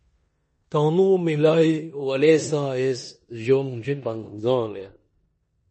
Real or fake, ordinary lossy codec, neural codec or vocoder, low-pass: fake; MP3, 32 kbps; codec, 16 kHz in and 24 kHz out, 0.9 kbps, LongCat-Audio-Codec, four codebook decoder; 10.8 kHz